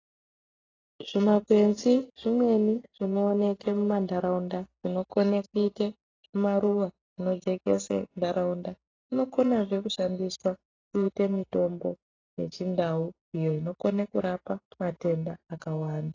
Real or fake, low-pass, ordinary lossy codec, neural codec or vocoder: real; 7.2 kHz; AAC, 32 kbps; none